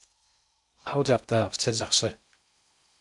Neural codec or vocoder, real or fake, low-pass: codec, 16 kHz in and 24 kHz out, 0.6 kbps, FocalCodec, streaming, 4096 codes; fake; 10.8 kHz